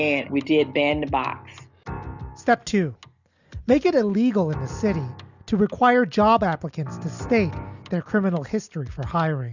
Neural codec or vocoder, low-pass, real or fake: none; 7.2 kHz; real